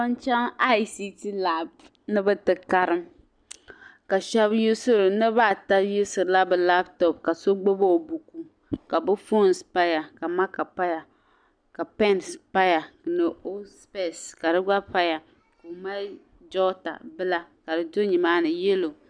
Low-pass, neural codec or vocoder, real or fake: 9.9 kHz; none; real